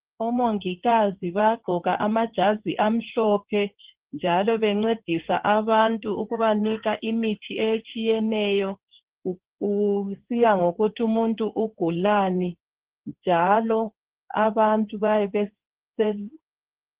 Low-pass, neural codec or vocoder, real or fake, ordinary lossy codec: 3.6 kHz; codec, 16 kHz in and 24 kHz out, 2.2 kbps, FireRedTTS-2 codec; fake; Opus, 16 kbps